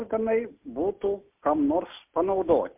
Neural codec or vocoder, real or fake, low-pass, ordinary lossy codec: none; real; 3.6 kHz; MP3, 32 kbps